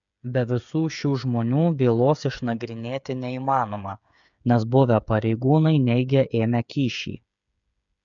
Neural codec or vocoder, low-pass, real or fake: codec, 16 kHz, 8 kbps, FreqCodec, smaller model; 7.2 kHz; fake